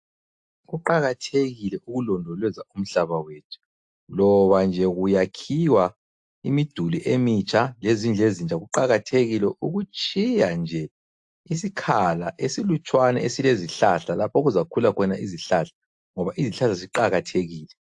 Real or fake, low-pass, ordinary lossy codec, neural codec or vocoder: real; 10.8 kHz; AAC, 64 kbps; none